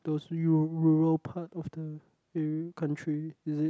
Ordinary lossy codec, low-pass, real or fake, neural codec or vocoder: none; none; real; none